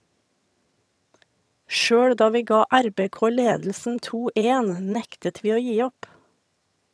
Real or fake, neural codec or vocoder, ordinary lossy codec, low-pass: fake; vocoder, 22.05 kHz, 80 mel bands, HiFi-GAN; none; none